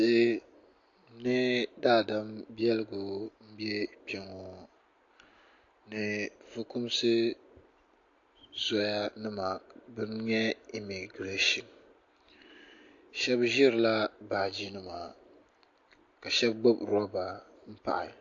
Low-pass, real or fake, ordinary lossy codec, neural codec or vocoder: 7.2 kHz; real; AAC, 48 kbps; none